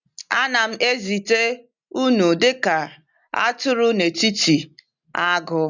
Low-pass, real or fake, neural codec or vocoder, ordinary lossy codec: 7.2 kHz; real; none; none